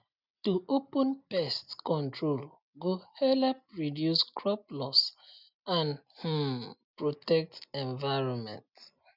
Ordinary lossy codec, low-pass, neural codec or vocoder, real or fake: none; 5.4 kHz; none; real